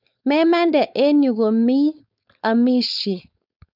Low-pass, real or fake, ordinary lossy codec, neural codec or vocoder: 5.4 kHz; fake; none; codec, 16 kHz, 4.8 kbps, FACodec